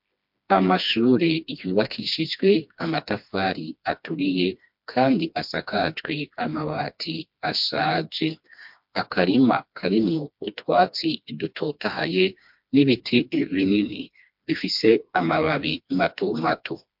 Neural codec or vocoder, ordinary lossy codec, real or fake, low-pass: codec, 16 kHz, 2 kbps, FreqCodec, smaller model; MP3, 48 kbps; fake; 5.4 kHz